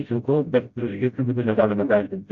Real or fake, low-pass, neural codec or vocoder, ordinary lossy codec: fake; 7.2 kHz; codec, 16 kHz, 0.5 kbps, FreqCodec, smaller model; Opus, 64 kbps